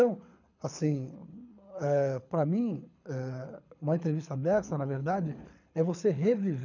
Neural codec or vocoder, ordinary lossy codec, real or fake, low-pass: codec, 24 kHz, 6 kbps, HILCodec; none; fake; 7.2 kHz